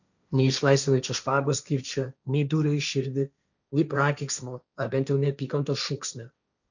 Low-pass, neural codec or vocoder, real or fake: 7.2 kHz; codec, 16 kHz, 1.1 kbps, Voila-Tokenizer; fake